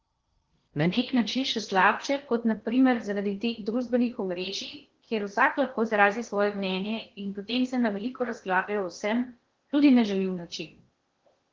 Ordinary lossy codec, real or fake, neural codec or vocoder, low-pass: Opus, 16 kbps; fake; codec, 16 kHz in and 24 kHz out, 0.8 kbps, FocalCodec, streaming, 65536 codes; 7.2 kHz